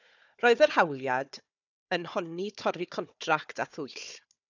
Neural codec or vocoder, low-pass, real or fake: codec, 16 kHz, 4 kbps, FunCodec, trained on Chinese and English, 50 frames a second; 7.2 kHz; fake